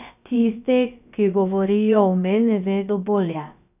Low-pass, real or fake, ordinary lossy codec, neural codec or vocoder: 3.6 kHz; fake; none; codec, 16 kHz, about 1 kbps, DyCAST, with the encoder's durations